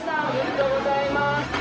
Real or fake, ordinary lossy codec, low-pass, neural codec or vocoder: fake; none; none; codec, 16 kHz, 0.4 kbps, LongCat-Audio-Codec